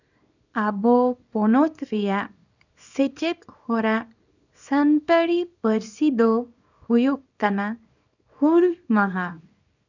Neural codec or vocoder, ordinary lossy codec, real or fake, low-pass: codec, 24 kHz, 0.9 kbps, WavTokenizer, small release; none; fake; 7.2 kHz